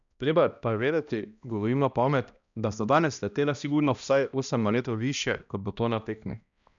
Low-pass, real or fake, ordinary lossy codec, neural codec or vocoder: 7.2 kHz; fake; none; codec, 16 kHz, 1 kbps, X-Codec, HuBERT features, trained on balanced general audio